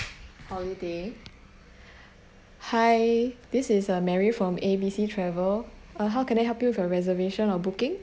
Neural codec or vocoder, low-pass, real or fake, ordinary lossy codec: none; none; real; none